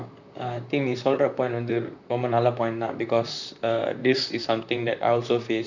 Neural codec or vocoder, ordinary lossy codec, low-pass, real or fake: vocoder, 44.1 kHz, 128 mel bands, Pupu-Vocoder; none; 7.2 kHz; fake